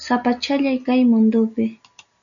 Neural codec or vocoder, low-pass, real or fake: none; 7.2 kHz; real